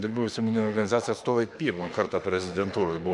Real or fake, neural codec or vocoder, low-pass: fake; autoencoder, 48 kHz, 32 numbers a frame, DAC-VAE, trained on Japanese speech; 10.8 kHz